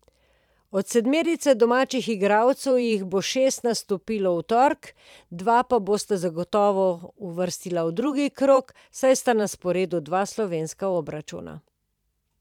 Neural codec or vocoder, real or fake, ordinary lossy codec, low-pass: vocoder, 44.1 kHz, 128 mel bands every 512 samples, BigVGAN v2; fake; none; 19.8 kHz